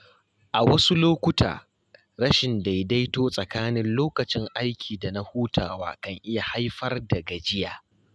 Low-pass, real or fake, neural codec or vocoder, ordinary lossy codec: none; real; none; none